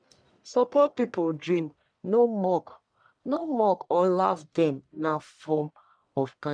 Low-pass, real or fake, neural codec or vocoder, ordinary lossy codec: 9.9 kHz; fake; codec, 44.1 kHz, 1.7 kbps, Pupu-Codec; none